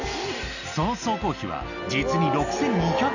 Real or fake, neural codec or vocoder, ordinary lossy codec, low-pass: real; none; none; 7.2 kHz